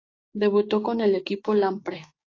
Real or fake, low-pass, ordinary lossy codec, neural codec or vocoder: fake; 7.2 kHz; AAC, 32 kbps; codec, 16 kHz, 6 kbps, DAC